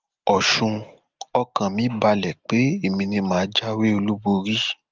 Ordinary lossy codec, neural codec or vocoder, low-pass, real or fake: Opus, 32 kbps; none; 7.2 kHz; real